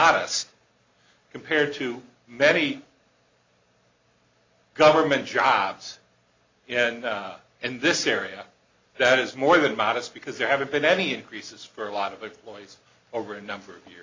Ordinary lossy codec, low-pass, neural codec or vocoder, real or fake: MP3, 64 kbps; 7.2 kHz; none; real